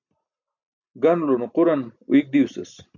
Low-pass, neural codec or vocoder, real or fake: 7.2 kHz; none; real